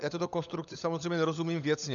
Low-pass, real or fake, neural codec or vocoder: 7.2 kHz; fake; codec, 16 kHz, 16 kbps, FunCodec, trained on LibriTTS, 50 frames a second